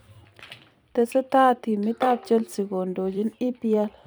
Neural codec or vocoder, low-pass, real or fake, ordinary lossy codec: vocoder, 44.1 kHz, 128 mel bands every 256 samples, BigVGAN v2; none; fake; none